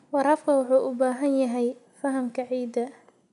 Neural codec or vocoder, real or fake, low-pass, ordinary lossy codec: none; real; 10.8 kHz; none